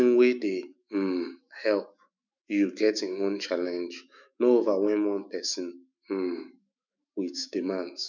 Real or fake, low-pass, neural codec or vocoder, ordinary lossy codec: fake; 7.2 kHz; autoencoder, 48 kHz, 128 numbers a frame, DAC-VAE, trained on Japanese speech; none